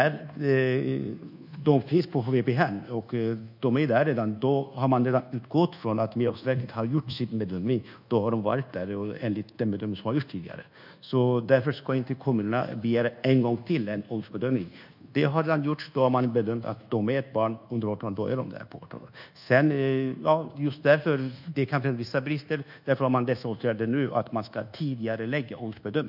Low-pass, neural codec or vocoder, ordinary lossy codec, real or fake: 5.4 kHz; codec, 24 kHz, 1.2 kbps, DualCodec; none; fake